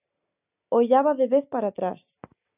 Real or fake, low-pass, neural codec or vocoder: real; 3.6 kHz; none